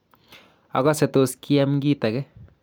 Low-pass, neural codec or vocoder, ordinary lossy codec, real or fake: none; none; none; real